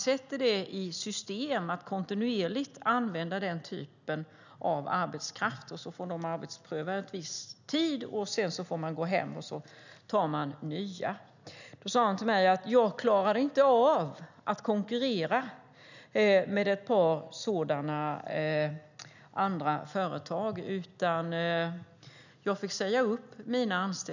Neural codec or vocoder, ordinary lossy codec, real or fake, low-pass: none; none; real; 7.2 kHz